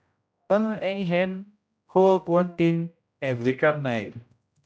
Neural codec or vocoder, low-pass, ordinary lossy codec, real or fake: codec, 16 kHz, 0.5 kbps, X-Codec, HuBERT features, trained on general audio; none; none; fake